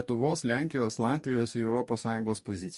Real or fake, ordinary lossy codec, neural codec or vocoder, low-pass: fake; MP3, 48 kbps; codec, 44.1 kHz, 2.6 kbps, DAC; 14.4 kHz